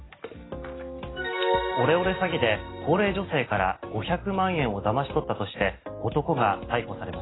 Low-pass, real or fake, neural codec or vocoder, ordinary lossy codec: 7.2 kHz; real; none; AAC, 16 kbps